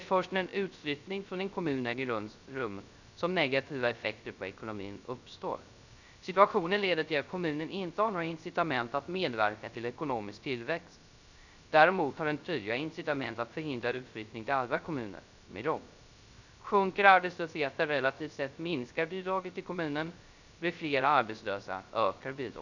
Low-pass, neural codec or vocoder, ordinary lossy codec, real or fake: 7.2 kHz; codec, 16 kHz, 0.3 kbps, FocalCodec; none; fake